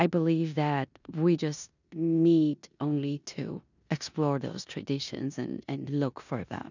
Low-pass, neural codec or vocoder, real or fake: 7.2 kHz; codec, 16 kHz in and 24 kHz out, 0.9 kbps, LongCat-Audio-Codec, four codebook decoder; fake